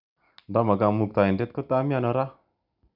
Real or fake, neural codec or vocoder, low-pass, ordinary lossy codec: real; none; 5.4 kHz; none